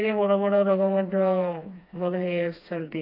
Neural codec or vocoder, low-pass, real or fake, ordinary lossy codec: codec, 16 kHz, 2 kbps, FreqCodec, smaller model; 5.4 kHz; fake; AAC, 32 kbps